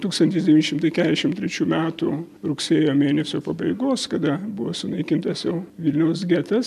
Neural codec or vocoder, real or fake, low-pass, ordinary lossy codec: none; real; 14.4 kHz; AAC, 96 kbps